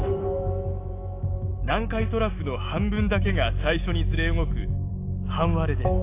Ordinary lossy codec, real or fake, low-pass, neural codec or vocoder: AAC, 24 kbps; fake; 3.6 kHz; autoencoder, 48 kHz, 128 numbers a frame, DAC-VAE, trained on Japanese speech